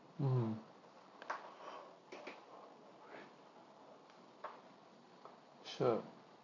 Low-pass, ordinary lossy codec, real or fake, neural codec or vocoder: 7.2 kHz; none; real; none